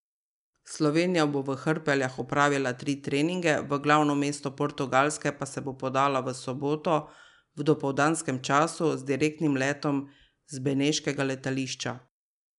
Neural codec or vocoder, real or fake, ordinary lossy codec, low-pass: none; real; none; 10.8 kHz